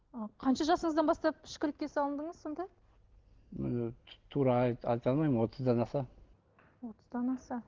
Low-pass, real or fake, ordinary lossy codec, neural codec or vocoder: 7.2 kHz; real; Opus, 16 kbps; none